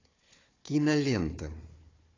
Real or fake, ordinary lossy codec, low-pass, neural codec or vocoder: fake; AAC, 32 kbps; 7.2 kHz; codec, 16 kHz, 4 kbps, FunCodec, trained on Chinese and English, 50 frames a second